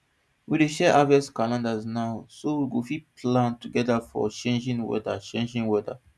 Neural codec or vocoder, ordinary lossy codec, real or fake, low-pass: none; none; real; none